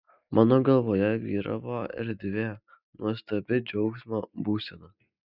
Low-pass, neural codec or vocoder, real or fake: 5.4 kHz; none; real